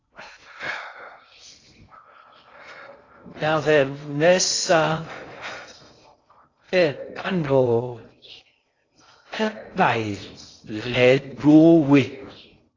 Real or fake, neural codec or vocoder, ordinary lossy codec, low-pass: fake; codec, 16 kHz in and 24 kHz out, 0.6 kbps, FocalCodec, streaming, 2048 codes; AAC, 32 kbps; 7.2 kHz